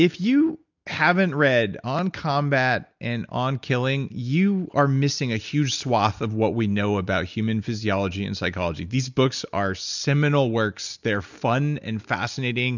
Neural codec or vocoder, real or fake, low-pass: none; real; 7.2 kHz